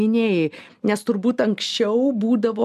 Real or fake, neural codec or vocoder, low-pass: real; none; 14.4 kHz